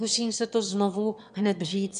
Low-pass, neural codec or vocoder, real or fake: 9.9 kHz; autoencoder, 22.05 kHz, a latent of 192 numbers a frame, VITS, trained on one speaker; fake